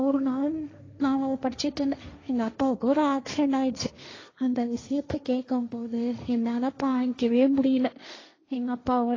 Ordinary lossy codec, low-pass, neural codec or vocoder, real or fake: AAC, 32 kbps; 7.2 kHz; codec, 16 kHz, 1.1 kbps, Voila-Tokenizer; fake